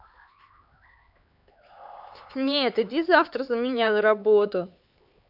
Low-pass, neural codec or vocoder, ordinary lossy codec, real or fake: 5.4 kHz; codec, 16 kHz, 4 kbps, X-Codec, HuBERT features, trained on LibriSpeech; none; fake